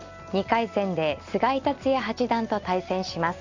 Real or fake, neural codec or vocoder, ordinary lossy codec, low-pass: real; none; Opus, 64 kbps; 7.2 kHz